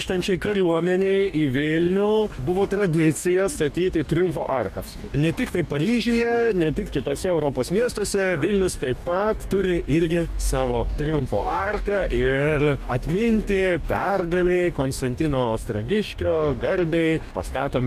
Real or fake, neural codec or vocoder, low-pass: fake; codec, 44.1 kHz, 2.6 kbps, DAC; 14.4 kHz